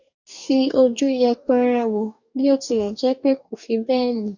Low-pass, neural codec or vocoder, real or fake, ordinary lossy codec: 7.2 kHz; codec, 44.1 kHz, 2.6 kbps, DAC; fake; none